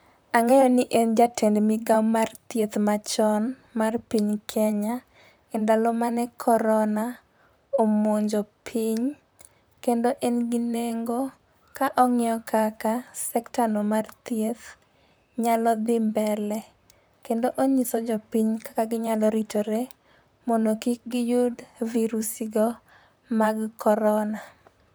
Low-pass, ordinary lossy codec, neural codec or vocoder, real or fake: none; none; vocoder, 44.1 kHz, 128 mel bands, Pupu-Vocoder; fake